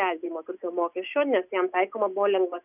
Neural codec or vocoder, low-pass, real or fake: none; 3.6 kHz; real